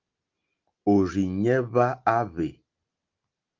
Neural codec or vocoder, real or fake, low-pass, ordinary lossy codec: none; real; 7.2 kHz; Opus, 32 kbps